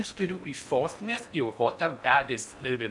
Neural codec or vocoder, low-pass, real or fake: codec, 16 kHz in and 24 kHz out, 0.6 kbps, FocalCodec, streaming, 4096 codes; 10.8 kHz; fake